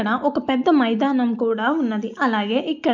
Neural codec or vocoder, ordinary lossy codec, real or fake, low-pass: none; none; real; 7.2 kHz